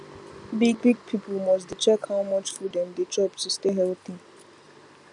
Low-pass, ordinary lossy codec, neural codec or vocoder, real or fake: 10.8 kHz; none; none; real